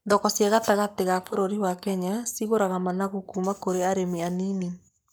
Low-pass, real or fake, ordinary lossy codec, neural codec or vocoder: none; fake; none; codec, 44.1 kHz, 7.8 kbps, Pupu-Codec